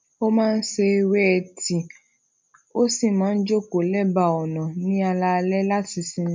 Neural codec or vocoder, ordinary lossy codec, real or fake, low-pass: none; MP3, 64 kbps; real; 7.2 kHz